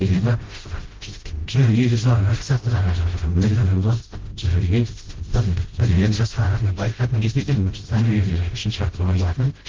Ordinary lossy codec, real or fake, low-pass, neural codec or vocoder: Opus, 16 kbps; fake; 7.2 kHz; codec, 16 kHz, 0.5 kbps, FreqCodec, smaller model